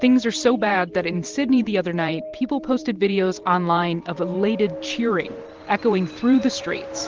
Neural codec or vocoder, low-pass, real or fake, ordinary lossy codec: vocoder, 44.1 kHz, 128 mel bands every 512 samples, BigVGAN v2; 7.2 kHz; fake; Opus, 16 kbps